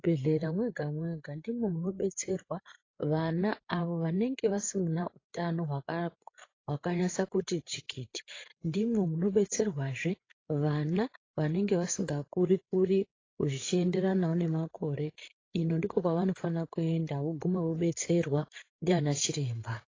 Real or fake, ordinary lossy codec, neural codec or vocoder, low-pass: fake; AAC, 32 kbps; codec, 16 kHz, 8 kbps, FreqCodec, larger model; 7.2 kHz